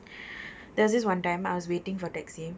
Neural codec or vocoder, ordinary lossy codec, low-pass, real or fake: none; none; none; real